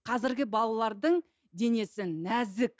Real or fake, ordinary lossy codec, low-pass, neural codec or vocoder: real; none; none; none